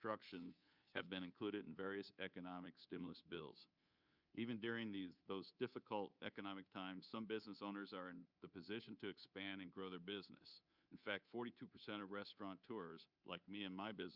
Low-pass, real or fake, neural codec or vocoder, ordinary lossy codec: 5.4 kHz; fake; codec, 24 kHz, 3.1 kbps, DualCodec; Opus, 64 kbps